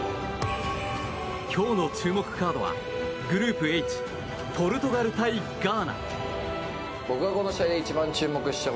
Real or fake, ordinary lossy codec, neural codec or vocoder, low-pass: real; none; none; none